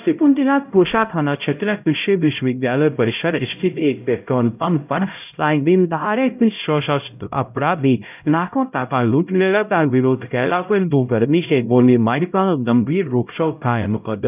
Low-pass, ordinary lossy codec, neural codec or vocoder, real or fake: 3.6 kHz; none; codec, 16 kHz, 0.5 kbps, X-Codec, HuBERT features, trained on LibriSpeech; fake